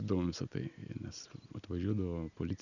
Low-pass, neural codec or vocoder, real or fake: 7.2 kHz; none; real